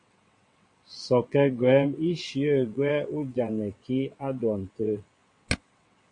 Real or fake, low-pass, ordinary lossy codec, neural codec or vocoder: fake; 9.9 kHz; MP3, 48 kbps; vocoder, 22.05 kHz, 80 mel bands, WaveNeXt